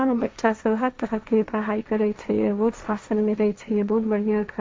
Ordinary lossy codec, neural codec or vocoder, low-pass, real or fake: none; codec, 16 kHz, 1.1 kbps, Voila-Tokenizer; none; fake